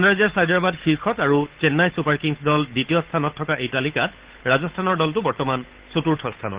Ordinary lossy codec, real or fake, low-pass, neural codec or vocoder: Opus, 16 kbps; fake; 3.6 kHz; codec, 16 kHz, 8 kbps, FunCodec, trained on Chinese and English, 25 frames a second